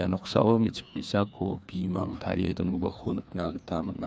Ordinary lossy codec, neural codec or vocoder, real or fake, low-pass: none; codec, 16 kHz, 2 kbps, FreqCodec, larger model; fake; none